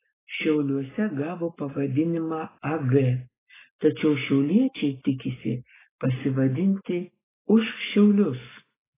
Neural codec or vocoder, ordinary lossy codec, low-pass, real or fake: codec, 44.1 kHz, 7.8 kbps, Pupu-Codec; AAC, 16 kbps; 3.6 kHz; fake